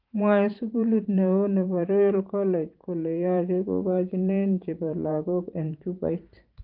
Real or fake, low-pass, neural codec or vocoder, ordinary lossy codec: fake; 5.4 kHz; vocoder, 44.1 kHz, 128 mel bands every 512 samples, BigVGAN v2; Opus, 32 kbps